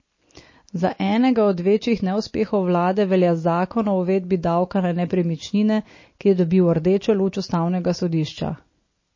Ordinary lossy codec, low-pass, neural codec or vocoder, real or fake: MP3, 32 kbps; 7.2 kHz; none; real